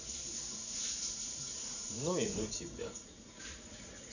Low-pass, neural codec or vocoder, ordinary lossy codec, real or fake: 7.2 kHz; vocoder, 44.1 kHz, 80 mel bands, Vocos; none; fake